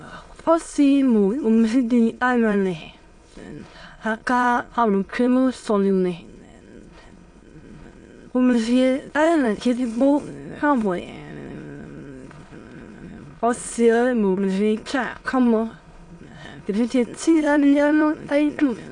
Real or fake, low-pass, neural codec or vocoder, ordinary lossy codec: fake; 9.9 kHz; autoencoder, 22.05 kHz, a latent of 192 numbers a frame, VITS, trained on many speakers; AAC, 48 kbps